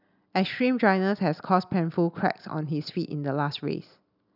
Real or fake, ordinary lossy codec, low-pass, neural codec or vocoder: real; none; 5.4 kHz; none